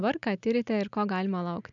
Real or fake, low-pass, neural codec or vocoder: real; 7.2 kHz; none